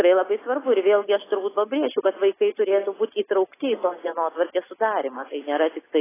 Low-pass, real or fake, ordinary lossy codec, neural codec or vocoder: 3.6 kHz; real; AAC, 16 kbps; none